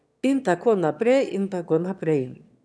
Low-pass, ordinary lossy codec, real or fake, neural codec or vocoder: none; none; fake; autoencoder, 22.05 kHz, a latent of 192 numbers a frame, VITS, trained on one speaker